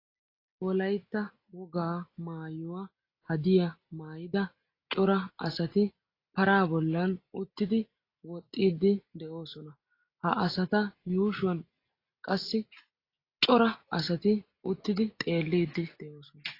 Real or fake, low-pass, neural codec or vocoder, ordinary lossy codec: real; 5.4 kHz; none; AAC, 32 kbps